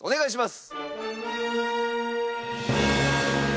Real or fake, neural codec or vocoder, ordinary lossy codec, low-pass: real; none; none; none